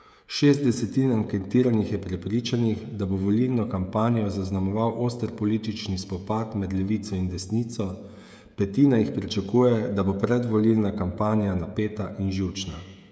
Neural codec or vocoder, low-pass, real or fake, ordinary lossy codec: codec, 16 kHz, 16 kbps, FreqCodec, smaller model; none; fake; none